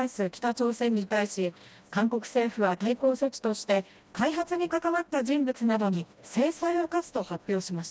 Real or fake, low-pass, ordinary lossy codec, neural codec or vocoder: fake; none; none; codec, 16 kHz, 1 kbps, FreqCodec, smaller model